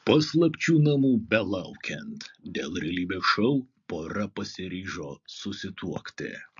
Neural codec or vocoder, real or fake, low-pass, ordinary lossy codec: none; real; 7.2 kHz; MP3, 48 kbps